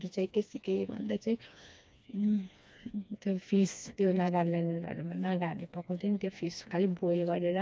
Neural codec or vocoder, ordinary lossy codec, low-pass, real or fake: codec, 16 kHz, 2 kbps, FreqCodec, smaller model; none; none; fake